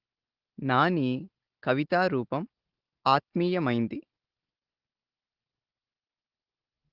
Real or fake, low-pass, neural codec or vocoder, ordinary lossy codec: real; 5.4 kHz; none; Opus, 16 kbps